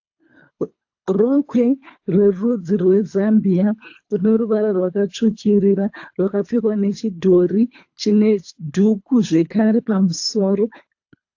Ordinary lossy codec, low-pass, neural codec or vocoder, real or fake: AAC, 48 kbps; 7.2 kHz; codec, 24 kHz, 3 kbps, HILCodec; fake